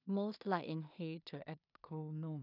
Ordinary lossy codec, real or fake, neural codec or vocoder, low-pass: none; fake; codec, 16 kHz in and 24 kHz out, 0.4 kbps, LongCat-Audio-Codec, two codebook decoder; 5.4 kHz